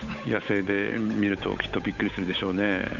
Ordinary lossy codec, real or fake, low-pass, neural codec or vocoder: none; fake; 7.2 kHz; codec, 16 kHz, 8 kbps, FunCodec, trained on Chinese and English, 25 frames a second